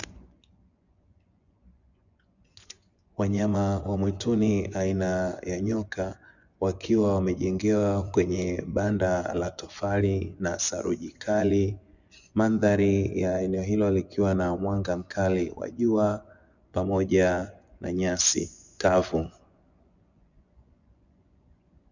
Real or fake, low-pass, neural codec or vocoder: fake; 7.2 kHz; vocoder, 24 kHz, 100 mel bands, Vocos